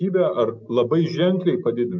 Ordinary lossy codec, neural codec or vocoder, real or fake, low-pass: MP3, 64 kbps; none; real; 7.2 kHz